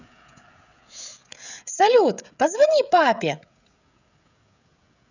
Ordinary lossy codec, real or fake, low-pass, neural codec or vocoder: none; fake; 7.2 kHz; codec, 16 kHz, 16 kbps, FreqCodec, smaller model